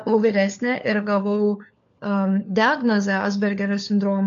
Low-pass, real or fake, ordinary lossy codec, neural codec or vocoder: 7.2 kHz; fake; AAC, 64 kbps; codec, 16 kHz, 4 kbps, FunCodec, trained on LibriTTS, 50 frames a second